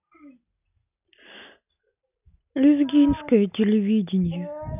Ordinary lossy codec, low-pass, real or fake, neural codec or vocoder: none; 3.6 kHz; real; none